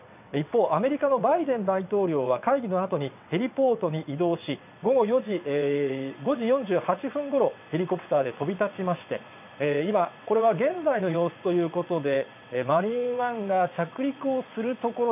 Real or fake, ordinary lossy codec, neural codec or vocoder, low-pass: fake; none; vocoder, 22.05 kHz, 80 mel bands, WaveNeXt; 3.6 kHz